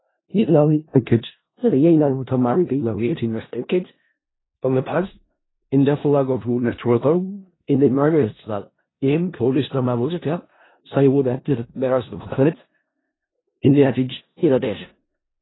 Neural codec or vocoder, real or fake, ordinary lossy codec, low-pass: codec, 16 kHz in and 24 kHz out, 0.4 kbps, LongCat-Audio-Codec, four codebook decoder; fake; AAC, 16 kbps; 7.2 kHz